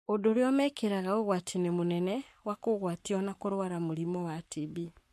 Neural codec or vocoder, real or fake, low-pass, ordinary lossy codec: codec, 44.1 kHz, 7.8 kbps, Pupu-Codec; fake; 14.4 kHz; MP3, 64 kbps